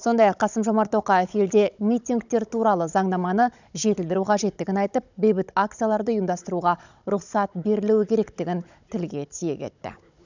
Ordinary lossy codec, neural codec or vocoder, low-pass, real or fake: none; codec, 16 kHz, 16 kbps, FunCodec, trained on Chinese and English, 50 frames a second; 7.2 kHz; fake